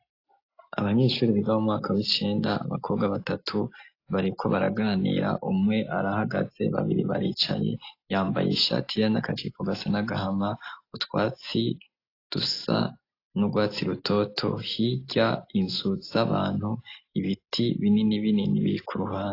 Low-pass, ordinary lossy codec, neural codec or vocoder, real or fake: 5.4 kHz; AAC, 32 kbps; none; real